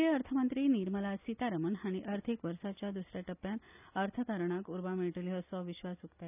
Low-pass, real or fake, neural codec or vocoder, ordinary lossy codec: 3.6 kHz; real; none; none